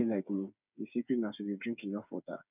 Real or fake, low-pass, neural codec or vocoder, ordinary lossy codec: fake; 3.6 kHz; codec, 16 kHz, 8 kbps, FreqCodec, smaller model; none